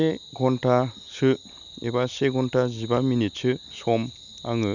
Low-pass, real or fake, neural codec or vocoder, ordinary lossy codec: 7.2 kHz; real; none; none